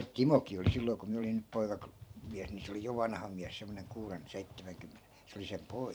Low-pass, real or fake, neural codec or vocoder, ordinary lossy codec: none; fake; vocoder, 44.1 kHz, 128 mel bands every 512 samples, BigVGAN v2; none